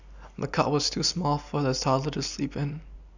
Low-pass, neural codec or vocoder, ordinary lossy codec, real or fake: 7.2 kHz; none; none; real